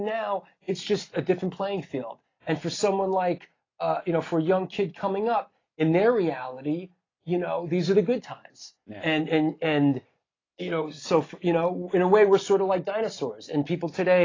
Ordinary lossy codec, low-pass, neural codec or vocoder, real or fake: AAC, 32 kbps; 7.2 kHz; none; real